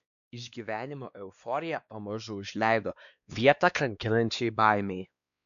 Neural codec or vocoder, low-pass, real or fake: codec, 16 kHz, 2 kbps, X-Codec, WavLM features, trained on Multilingual LibriSpeech; 7.2 kHz; fake